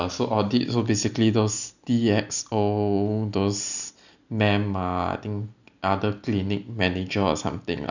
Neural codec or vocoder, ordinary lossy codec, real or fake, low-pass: none; none; real; 7.2 kHz